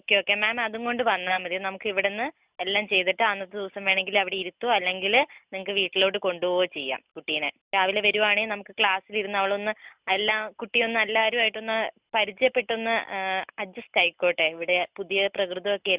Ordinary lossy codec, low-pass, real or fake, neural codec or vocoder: Opus, 64 kbps; 3.6 kHz; real; none